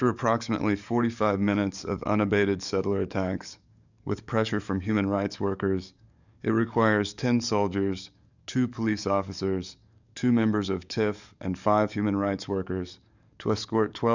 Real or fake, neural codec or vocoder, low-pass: fake; codec, 44.1 kHz, 7.8 kbps, DAC; 7.2 kHz